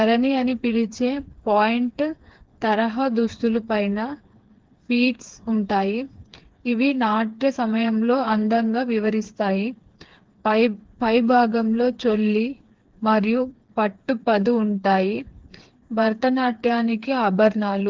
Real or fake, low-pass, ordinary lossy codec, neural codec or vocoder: fake; 7.2 kHz; Opus, 16 kbps; codec, 16 kHz, 4 kbps, FreqCodec, smaller model